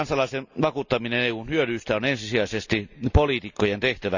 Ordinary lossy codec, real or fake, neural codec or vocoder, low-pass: none; real; none; 7.2 kHz